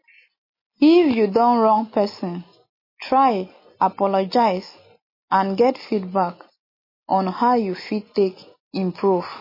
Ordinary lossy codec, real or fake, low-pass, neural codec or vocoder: MP3, 24 kbps; real; 5.4 kHz; none